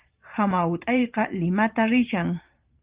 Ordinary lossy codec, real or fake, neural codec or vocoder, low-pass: Opus, 32 kbps; fake; vocoder, 44.1 kHz, 128 mel bands every 512 samples, BigVGAN v2; 3.6 kHz